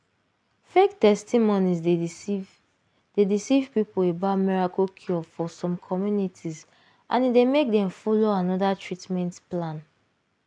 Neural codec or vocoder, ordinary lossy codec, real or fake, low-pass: none; none; real; 9.9 kHz